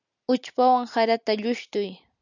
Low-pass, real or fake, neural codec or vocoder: 7.2 kHz; real; none